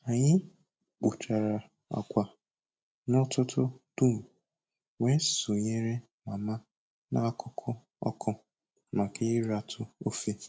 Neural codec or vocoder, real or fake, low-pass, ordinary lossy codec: none; real; none; none